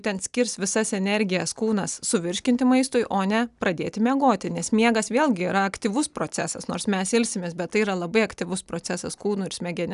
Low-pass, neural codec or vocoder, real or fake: 10.8 kHz; none; real